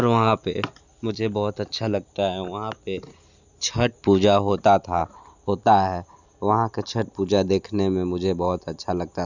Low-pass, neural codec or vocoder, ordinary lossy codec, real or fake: 7.2 kHz; none; none; real